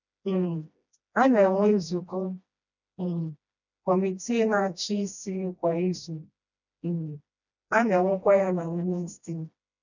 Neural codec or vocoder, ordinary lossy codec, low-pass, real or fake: codec, 16 kHz, 1 kbps, FreqCodec, smaller model; none; 7.2 kHz; fake